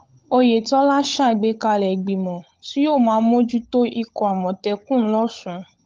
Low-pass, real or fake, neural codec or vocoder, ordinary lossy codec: 7.2 kHz; fake; codec, 16 kHz, 16 kbps, FunCodec, trained on LibriTTS, 50 frames a second; Opus, 32 kbps